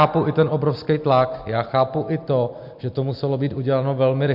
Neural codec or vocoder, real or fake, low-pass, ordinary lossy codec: none; real; 5.4 kHz; MP3, 48 kbps